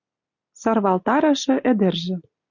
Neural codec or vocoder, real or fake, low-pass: none; real; 7.2 kHz